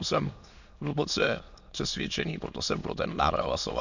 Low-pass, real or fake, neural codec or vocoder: 7.2 kHz; fake; autoencoder, 22.05 kHz, a latent of 192 numbers a frame, VITS, trained on many speakers